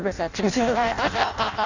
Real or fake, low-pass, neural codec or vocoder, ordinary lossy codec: fake; 7.2 kHz; codec, 16 kHz in and 24 kHz out, 0.6 kbps, FireRedTTS-2 codec; none